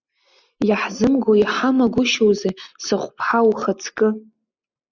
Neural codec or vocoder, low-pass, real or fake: none; 7.2 kHz; real